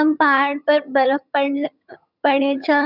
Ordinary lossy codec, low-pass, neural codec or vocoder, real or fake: none; 5.4 kHz; vocoder, 22.05 kHz, 80 mel bands, HiFi-GAN; fake